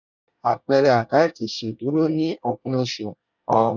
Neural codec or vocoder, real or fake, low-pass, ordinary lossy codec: codec, 24 kHz, 1 kbps, SNAC; fake; 7.2 kHz; none